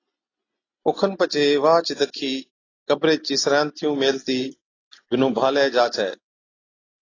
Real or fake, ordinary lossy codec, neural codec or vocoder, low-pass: real; AAC, 32 kbps; none; 7.2 kHz